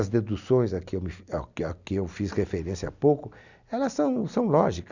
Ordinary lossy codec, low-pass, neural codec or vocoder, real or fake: none; 7.2 kHz; none; real